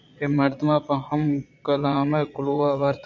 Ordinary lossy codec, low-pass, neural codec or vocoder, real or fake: MP3, 64 kbps; 7.2 kHz; vocoder, 22.05 kHz, 80 mel bands, Vocos; fake